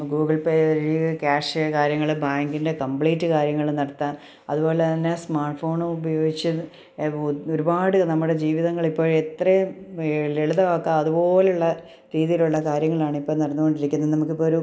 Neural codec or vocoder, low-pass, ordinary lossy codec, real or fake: none; none; none; real